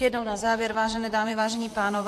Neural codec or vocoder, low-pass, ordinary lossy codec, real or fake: vocoder, 44.1 kHz, 128 mel bands, Pupu-Vocoder; 14.4 kHz; AAC, 64 kbps; fake